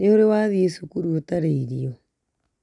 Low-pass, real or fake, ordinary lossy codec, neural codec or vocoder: 10.8 kHz; real; none; none